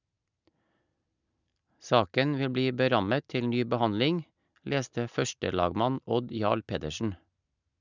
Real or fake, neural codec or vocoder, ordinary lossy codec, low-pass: real; none; none; 7.2 kHz